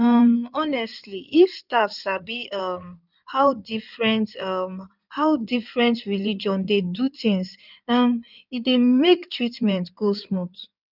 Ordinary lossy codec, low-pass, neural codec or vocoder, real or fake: none; 5.4 kHz; codec, 16 kHz in and 24 kHz out, 2.2 kbps, FireRedTTS-2 codec; fake